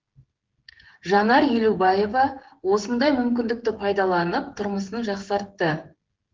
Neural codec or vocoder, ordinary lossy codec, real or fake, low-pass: codec, 16 kHz, 16 kbps, FreqCodec, smaller model; Opus, 16 kbps; fake; 7.2 kHz